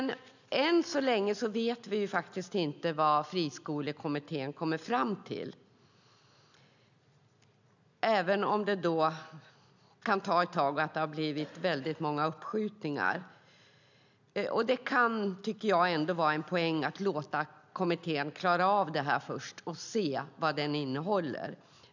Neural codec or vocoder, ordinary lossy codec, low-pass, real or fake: none; none; 7.2 kHz; real